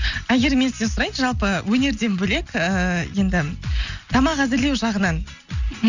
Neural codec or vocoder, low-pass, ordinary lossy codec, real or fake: none; 7.2 kHz; none; real